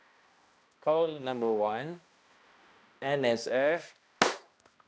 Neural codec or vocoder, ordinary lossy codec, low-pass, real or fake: codec, 16 kHz, 1 kbps, X-Codec, HuBERT features, trained on balanced general audio; none; none; fake